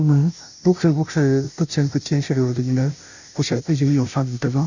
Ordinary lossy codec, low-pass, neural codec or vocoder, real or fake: none; 7.2 kHz; codec, 16 kHz, 0.5 kbps, FunCodec, trained on Chinese and English, 25 frames a second; fake